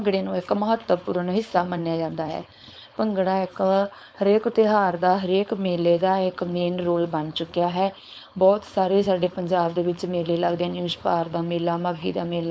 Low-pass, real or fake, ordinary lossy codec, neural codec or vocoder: none; fake; none; codec, 16 kHz, 4.8 kbps, FACodec